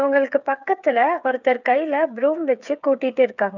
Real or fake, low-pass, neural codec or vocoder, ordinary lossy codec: fake; 7.2 kHz; codec, 16 kHz, 8 kbps, FreqCodec, smaller model; none